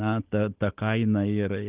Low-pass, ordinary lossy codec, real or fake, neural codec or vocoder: 3.6 kHz; Opus, 32 kbps; real; none